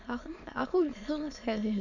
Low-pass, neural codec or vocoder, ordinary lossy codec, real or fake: 7.2 kHz; autoencoder, 22.05 kHz, a latent of 192 numbers a frame, VITS, trained on many speakers; none; fake